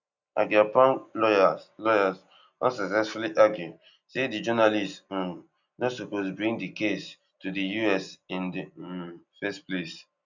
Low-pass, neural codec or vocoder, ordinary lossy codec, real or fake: 7.2 kHz; none; none; real